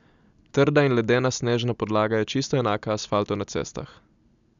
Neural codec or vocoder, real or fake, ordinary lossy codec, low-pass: none; real; MP3, 96 kbps; 7.2 kHz